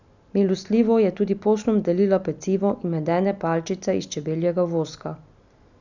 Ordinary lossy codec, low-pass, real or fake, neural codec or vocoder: none; 7.2 kHz; real; none